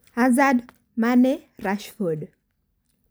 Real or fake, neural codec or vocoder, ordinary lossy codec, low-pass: real; none; none; none